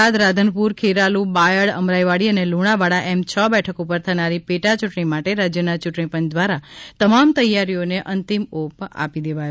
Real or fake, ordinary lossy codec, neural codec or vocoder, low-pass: real; none; none; none